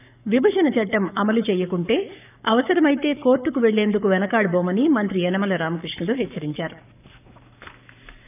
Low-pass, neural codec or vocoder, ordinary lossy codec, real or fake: 3.6 kHz; codec, 44.1 kHz, 7.8 kbps, Pupu-Codec; none; fake